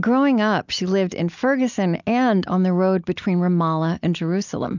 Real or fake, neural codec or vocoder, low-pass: real; none; 7.2 kHz